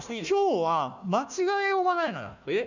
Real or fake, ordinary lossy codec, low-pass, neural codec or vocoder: fake; none; 7.2 kHz; codec, 16 kHz, 1 kbps, FunCodec, trained on Chinese and English, 50 frames a second